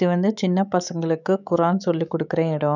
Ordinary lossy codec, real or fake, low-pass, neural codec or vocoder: none; fake; 7.2 kHz; autoencoder, 48 kHz, 128 numbers a frame, DAC-VAE, trained on Japanese speech